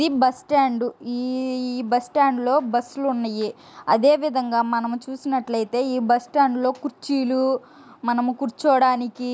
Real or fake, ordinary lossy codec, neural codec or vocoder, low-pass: real; none; none; none